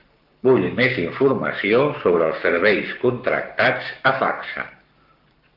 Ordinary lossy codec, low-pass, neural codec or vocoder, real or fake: Opus, 32 kbps; 5.4 kHz; codec, 44.1 kHz, 7.8 kbps, Pupu-Codec; fake